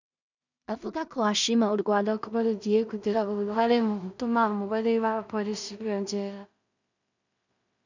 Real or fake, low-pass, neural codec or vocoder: fake; 7.2 kHz; codec, 16 kHz in and 24 kHz out, 0.4 kbps, LongCat-Audio-Codec, two codebook decoder